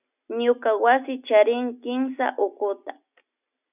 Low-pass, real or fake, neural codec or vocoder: 3.6 kHz; real; none